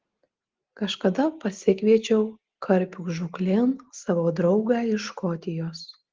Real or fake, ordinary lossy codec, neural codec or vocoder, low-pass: real; Opus, 16 kbps; none; 7.2 kHz